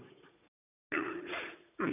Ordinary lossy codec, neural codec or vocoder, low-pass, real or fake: none; vocoder, 44.1 kHz, 80 mel bands, Vocos; 3.6 kHz; fake